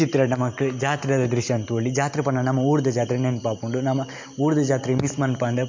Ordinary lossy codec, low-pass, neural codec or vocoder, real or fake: MP3, 48 kbps; 7.2 kHz; none; real